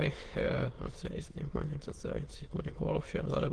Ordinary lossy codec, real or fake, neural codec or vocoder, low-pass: Opus, 16 kbps; fake; autoencoder, 22.05 kHz, a latent of 192 numbers a frame, VITS, trained on many speakers; 9.9 kHz